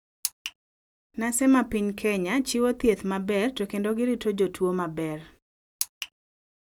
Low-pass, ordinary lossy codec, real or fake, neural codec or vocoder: 19.8 kHz; none; real; none